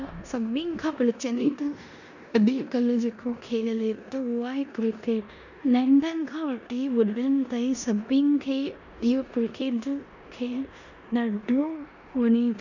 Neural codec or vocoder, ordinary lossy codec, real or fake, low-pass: codec, 16 kHz in and 24 kHz out, 0.9 kbps, LongCat-Audio-Codec, four codebook decoder; none; fake; 7.2 kHz